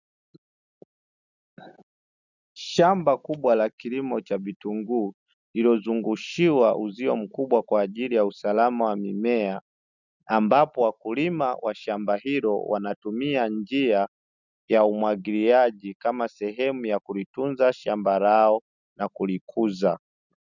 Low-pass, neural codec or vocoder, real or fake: 7.2 kHz; none; real